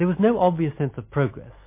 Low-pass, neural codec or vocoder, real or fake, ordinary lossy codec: 3.6 kHz; none; real; MP3, 24 kbps